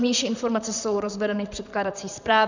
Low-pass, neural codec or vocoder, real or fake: 7.2 kHz; vocoder, 44.1 kHz, 128 mel bands, Pupu-Vocoder; fake